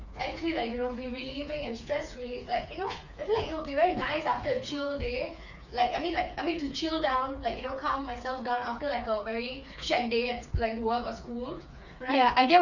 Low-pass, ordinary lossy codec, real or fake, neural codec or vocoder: 7.2 kHz; none; fake; codec, 16 kHz, 4 kbps, FreqCodec, smaller model